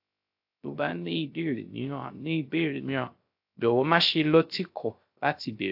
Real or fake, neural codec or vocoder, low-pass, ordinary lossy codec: fake; codec, 16 kHz, 0.3 kbps, FocalCodec; 5.4 kHz; AAC, 48 kbps